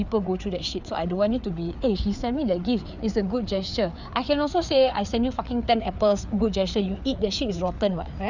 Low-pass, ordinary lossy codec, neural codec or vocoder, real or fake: 7.2 kHz; none; codec, 16 kHz, 4 kbps, FreqCodec, larger model; fake